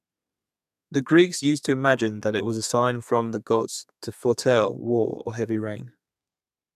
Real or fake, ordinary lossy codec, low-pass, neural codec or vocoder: fake; none; 14.4 kHz; codec, 32 kHz, 1.9 kbps, SNAC